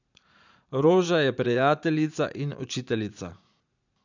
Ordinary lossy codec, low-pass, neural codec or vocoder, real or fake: none; 7.2 kHz; vocoder, 44.1 kHz, 80 mel bands, Vocos; fake